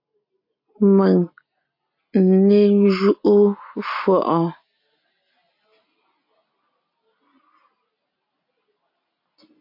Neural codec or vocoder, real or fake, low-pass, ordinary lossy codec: none; real; 5.4 kHz; MP3, 32 kbps